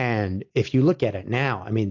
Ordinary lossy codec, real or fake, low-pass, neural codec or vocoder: AAC, 48 kbps; real; 7.2 kHz; none